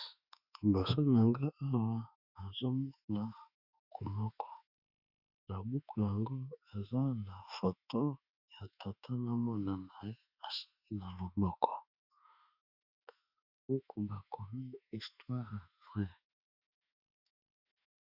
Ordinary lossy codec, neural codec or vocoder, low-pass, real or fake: Opus, 64 kbps; autoencoder, 48 kHz, 32 numbers a frame, DAC-VAE, trained on Japanese speech; 5.4 kHz; fake